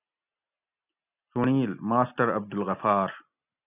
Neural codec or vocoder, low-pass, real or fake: none; 3.6 kHz; real